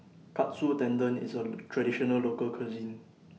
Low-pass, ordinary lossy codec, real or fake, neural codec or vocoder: none; none; real; none